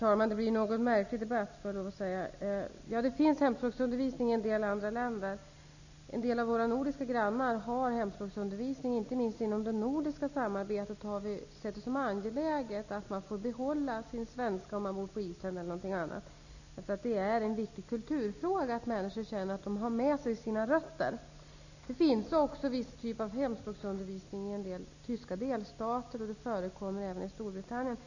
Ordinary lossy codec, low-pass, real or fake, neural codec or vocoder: none; 7.2 kHz; real; none